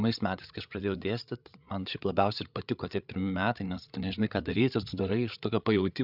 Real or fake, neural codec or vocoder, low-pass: fake; codec, 16 kHz, 8 kbps, FreqCodec, larger model; 5.4 kHz